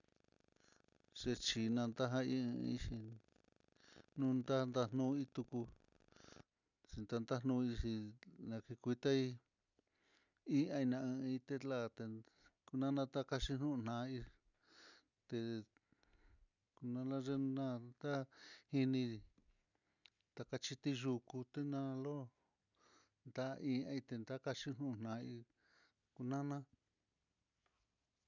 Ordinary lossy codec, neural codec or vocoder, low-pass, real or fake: none; none; 7.2 kHz; real